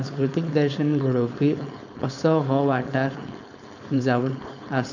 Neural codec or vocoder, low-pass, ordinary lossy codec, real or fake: codec, 16 kHz, 4.8 kbps, FACodec; 7.2 kHz; none; fake